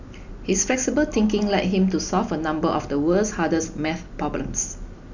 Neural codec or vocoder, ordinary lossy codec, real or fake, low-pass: none; none; real; 7.2 kHz